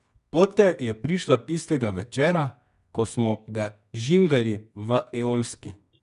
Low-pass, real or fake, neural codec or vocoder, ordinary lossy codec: 10.8 kHz; fake; codec, 24 kHz, 0.9 kbps, WavTokenizer, medium music audio release; none